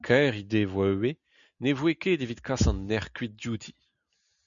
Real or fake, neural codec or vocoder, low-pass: real; none; 7.2 kHz